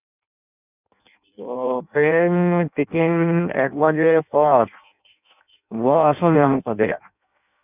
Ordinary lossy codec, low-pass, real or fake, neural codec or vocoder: none; 3.6 kHz; fake; codec, 16 kHz in and 24 kHz out, 0.6 kbps, FireRedTTS-2 codec